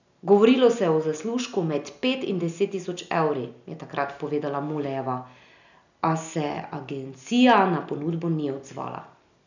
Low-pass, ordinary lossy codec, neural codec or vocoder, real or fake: 7.2 kHz; none; none; real